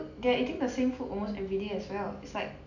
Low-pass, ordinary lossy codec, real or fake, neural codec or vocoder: 7.2 kHz; none; real; none